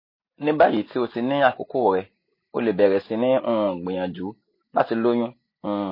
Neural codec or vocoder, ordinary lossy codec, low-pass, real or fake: none; MP3, 24 kbps; 5.4 kHz; real